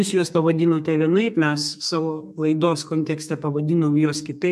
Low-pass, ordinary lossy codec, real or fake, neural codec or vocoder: 14.4 kHz; AAC, 96 kbps; fake; codec, 32 kHz, 1.9 kbps, SNAC